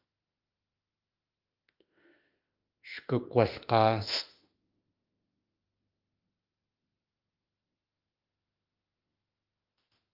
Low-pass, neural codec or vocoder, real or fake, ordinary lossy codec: 5.4 kHz; autoencoder, 48 kHz, 32 numbers a frame, DAC-VAE, trained on Japanese speech; fake; Opus, 32 kbps